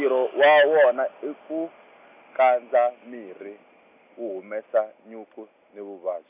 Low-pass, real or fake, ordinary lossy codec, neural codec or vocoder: 3.6 kHz; real; none; none